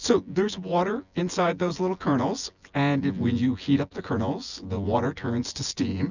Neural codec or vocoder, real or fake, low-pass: vocoder, 24 kHz, 100 mel bands, Vocos; fake; 7.2 kHz